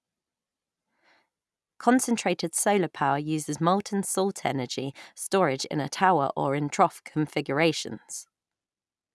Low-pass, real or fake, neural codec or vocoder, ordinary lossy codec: none; real; none; none